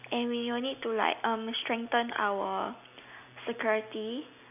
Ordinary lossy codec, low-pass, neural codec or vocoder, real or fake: none; 3.6 kHz; none; real